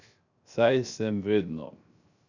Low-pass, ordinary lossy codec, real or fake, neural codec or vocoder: 7.2 kHz; none; fake; codec, 16 kHz, 0.3 kbps, FocalCodec